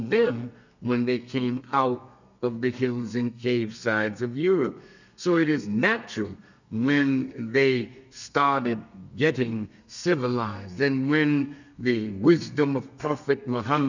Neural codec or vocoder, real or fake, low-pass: codec, 32 kHz, 1.9 kbps, SNAC; fake; 7.2 kHz